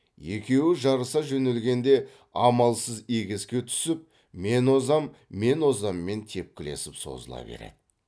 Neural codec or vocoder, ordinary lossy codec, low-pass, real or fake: none; none; none; real